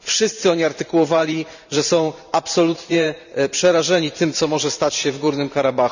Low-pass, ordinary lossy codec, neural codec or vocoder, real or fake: 7.2 kHz; none; vocoder, 44.1 kHz, 128 mel bands every 512 samples, BigVGAN v2; fake